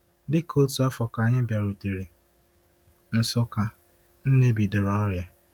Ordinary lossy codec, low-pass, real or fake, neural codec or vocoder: none; 19.8 kHz; fake; codec, 44.1 kHz, 7.8 kbps, DAC